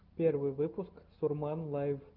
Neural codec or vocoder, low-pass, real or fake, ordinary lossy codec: none; 5.4 kHz; real; Opus, 32 kbps